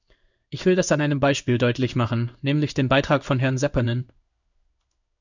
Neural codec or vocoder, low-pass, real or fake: codec, 16 kHz in and 24 kHz out, 1 kbps, XY-Tokenizer; 7.2 kHz; fake